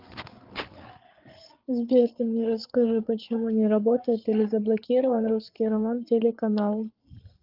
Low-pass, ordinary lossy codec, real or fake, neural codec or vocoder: 5.4 kHz; Opus, 32 kbps; fake; codec, 16 kHz, 8 kbps, FreqCodec, larger model